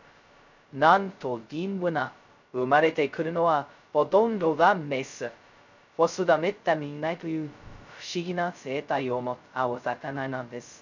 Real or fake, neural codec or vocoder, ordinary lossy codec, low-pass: fake; codec, 16 kHz, 0.2 kbps, FocalCodec; none; 7.2 kHz